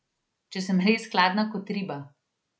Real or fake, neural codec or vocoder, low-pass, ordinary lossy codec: real; none; none; none